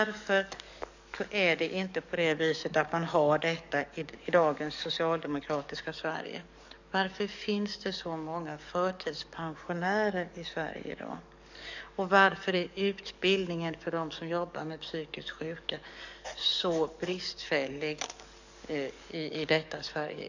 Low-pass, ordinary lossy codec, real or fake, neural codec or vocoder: 7.2 kHz; none; fake; codec, 44.1 kHz, 7.8 kbps, DAC